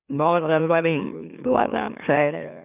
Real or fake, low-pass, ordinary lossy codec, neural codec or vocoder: fake; 3.6 kHz; none; autoencoder, 44.1 kHz, a latent of 192 numbers a frame, MeloTTS